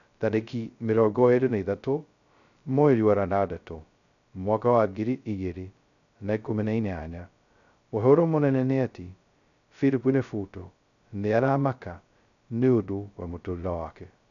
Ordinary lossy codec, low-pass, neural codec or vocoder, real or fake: none; 7.2 kHz; codec, 16 kHz, 0.2 kbps, FocalCodec; fake